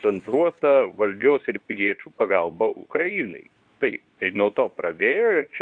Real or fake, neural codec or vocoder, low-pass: fake; codec, 24 kHz, 0.9 kbps, WavTokenizer, medium speech release version 2; 9.9 kHz